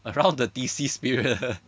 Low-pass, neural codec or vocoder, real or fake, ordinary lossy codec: none; none; real; none